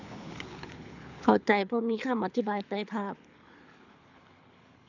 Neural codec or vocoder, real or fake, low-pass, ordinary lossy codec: codec, 24 kHz, 6 kbps, HILCodec; fake; 7.2 kHz; none